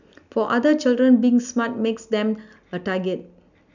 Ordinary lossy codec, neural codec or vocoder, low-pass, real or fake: none; none; 7.2 kHz; real